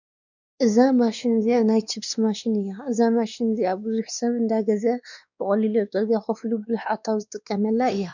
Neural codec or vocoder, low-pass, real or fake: codec, 16 kHz, 2 kbps, X-Codec, WavLM features, trained on Multilingual LibriSpeech; 7.2 kHz; fake